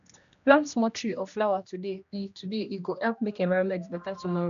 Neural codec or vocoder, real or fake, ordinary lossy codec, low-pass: codec, 16 kHz, 1 kbps, X-Codec, HuBERT features, trained on general audio; fake; MP3, 96 kbps; 7.2 kHz